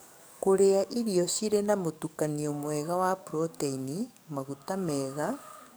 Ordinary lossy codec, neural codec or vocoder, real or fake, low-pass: none; codec, 44.1 kHz, 7.8 kbps, DAC; fake; none